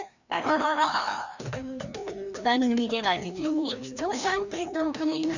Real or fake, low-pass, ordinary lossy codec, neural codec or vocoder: fake; 7.2 kHz; none; codec, 16 kHz, 1 kbps, FreqCodec, larger model